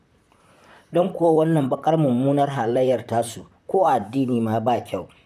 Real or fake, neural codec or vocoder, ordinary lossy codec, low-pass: fake; vocoder, 44.1 kHz, 128 mel bands, Pupu-Vocoder; none; 14.4 kHz